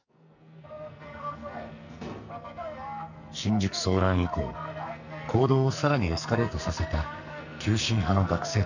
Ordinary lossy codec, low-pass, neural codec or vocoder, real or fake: none; 7.2 kHz; codec, 44.1 kHz, 2.6 kbps, SNAC; fake